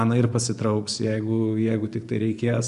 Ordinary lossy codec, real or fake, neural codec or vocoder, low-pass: MP3, 96 kbps; fake; vocoder, 24 kHz, 100 mel bands, Vocos; 10.8 kHz